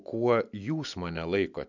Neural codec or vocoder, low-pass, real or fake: none; 7.2 kHz; real